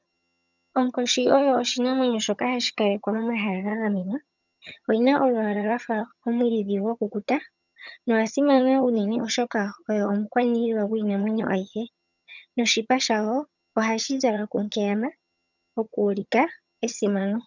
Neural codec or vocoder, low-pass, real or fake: vocoder, 22.05 kHz, 80 mel bands, HiFi-GAN; 7.2 kHz; fake